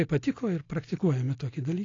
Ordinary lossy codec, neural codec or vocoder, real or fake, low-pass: AAC, 32 kbps; none; real; 7.2 kHz